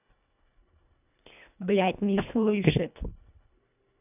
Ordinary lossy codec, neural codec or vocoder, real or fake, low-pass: none; codec, 24 kHz, 1.5 kbps, HILCodec; fake; 3.6 kHz